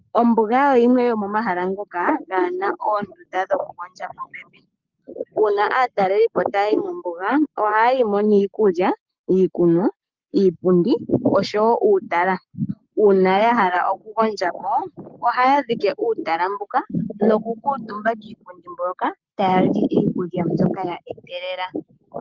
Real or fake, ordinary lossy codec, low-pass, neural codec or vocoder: fake; Opus, 24 kbps; 7.2 kHz; codec, 44.1 kHz, 7.8 kbps, Pupu-Codec